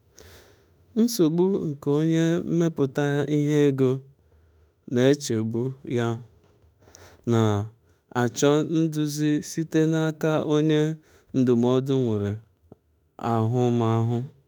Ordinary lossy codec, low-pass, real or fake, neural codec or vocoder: none; none; fake; autoencoder, 48 kHz, 32 numbers a frame, DAC-VAE, trained on Japanese speech